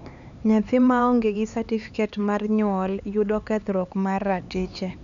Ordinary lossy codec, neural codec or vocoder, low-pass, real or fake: none; codec, 16 kHz, 4 kbps, X-Codec, HuBERT features, trained on LibriSpeech; 7.2 kHz; fake